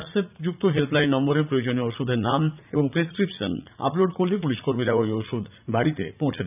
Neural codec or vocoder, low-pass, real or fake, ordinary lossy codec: vocoder, 44.1 kHz, 80 mel bands, Vocos; 3.6 kHz; fake; none